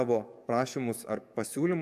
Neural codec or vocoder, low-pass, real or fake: codec, 44.1 kHz, 7.8 kbps, DAC; 14.4 kHz; fake